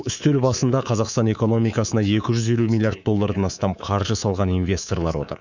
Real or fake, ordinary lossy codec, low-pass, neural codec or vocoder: fake; none; 7.2 kHz; codec, 24 kHz, 3.1 kbps, DualCodec